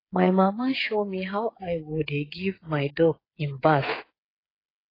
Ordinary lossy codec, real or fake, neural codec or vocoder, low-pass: AAC, 24 kbps; fake; codec, 16 kHz, 8 kbps, FreqCodec, smaller model; 5.4 kHz